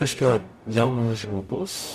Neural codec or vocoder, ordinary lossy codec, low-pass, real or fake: codec, 44.1 kHz, 0.9 kbps, DAC; Opus, 64 kbps; 14.4 kHz; fake